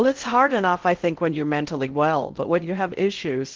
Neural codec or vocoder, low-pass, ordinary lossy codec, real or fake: codec, 16 kHz in and 24 kHz out, 0.6 kbps, FocalCodec, streaming, 4096 codes; 7.2 kHz; Opus, 16 kbps; fake